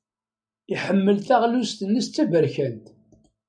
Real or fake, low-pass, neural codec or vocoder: real; 9.9 kHz; none